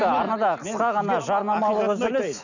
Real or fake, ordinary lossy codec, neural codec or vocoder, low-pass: real; none; none; 7.2 kHz